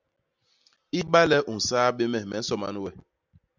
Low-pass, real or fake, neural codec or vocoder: 7.2 kHz; real; none